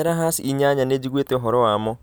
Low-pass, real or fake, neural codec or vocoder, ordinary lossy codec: none; real; none; none